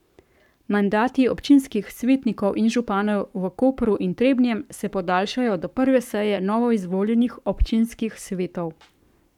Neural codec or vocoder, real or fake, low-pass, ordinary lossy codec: codec, 44.1 kHz, 7.8 kbps, Pupu-Codec; fake; 19.8 kHz; none